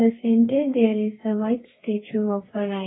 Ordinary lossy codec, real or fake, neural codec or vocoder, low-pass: AAC, 16 kbps; fake; codec, 32 kHz, 1.9 kbps, SNAC; 7.2 kHz